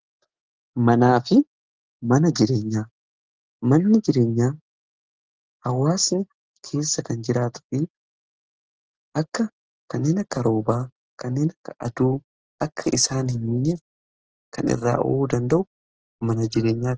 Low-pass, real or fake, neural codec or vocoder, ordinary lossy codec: 7.2 kHz; real; none; Opus, 16 kbps